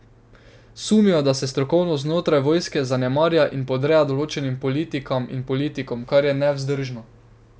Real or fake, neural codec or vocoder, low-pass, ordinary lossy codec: real; none; none; none